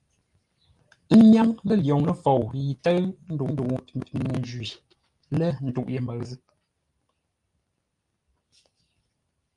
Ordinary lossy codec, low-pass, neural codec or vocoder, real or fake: Opus, 32 kbps; 10.8 kHz; none; real